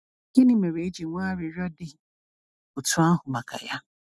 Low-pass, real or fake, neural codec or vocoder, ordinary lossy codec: none; real; none; none